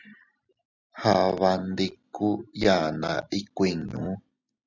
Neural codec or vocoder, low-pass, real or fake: none; 7.2 kHz; real